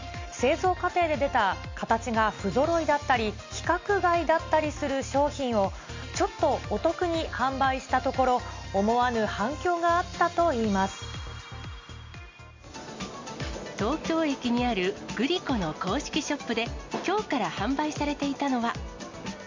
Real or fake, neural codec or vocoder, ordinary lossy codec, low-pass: real; none; MP3, 48 kbps; 7.2 kHz